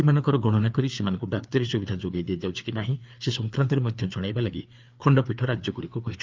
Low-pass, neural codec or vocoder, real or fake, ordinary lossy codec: 7.2 kHz; codec, 16 kHz, 4 kbps, FreqCodec, larger model; fake; Opus, 32 kbps